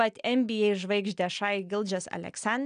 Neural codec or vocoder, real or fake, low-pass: none; real; 9.9 kHz